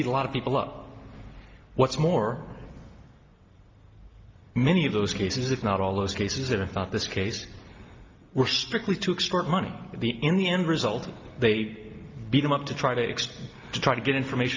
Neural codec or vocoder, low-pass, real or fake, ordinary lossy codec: none; 7.2 kHz; real; Opus, 24 kbps